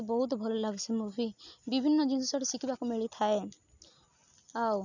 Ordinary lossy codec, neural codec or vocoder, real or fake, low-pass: none; none; real; 7.2 kHz